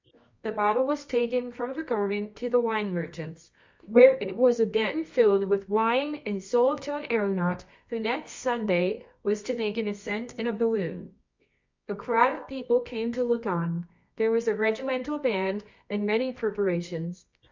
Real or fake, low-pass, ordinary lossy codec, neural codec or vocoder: fake; 7.2 kHz; MP3, 48 kbps; codec, 24 kHz, 0.9 kbps, WavTokenizer, medium music audio release